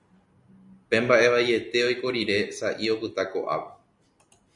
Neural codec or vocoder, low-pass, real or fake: none; 10.8 kHz; real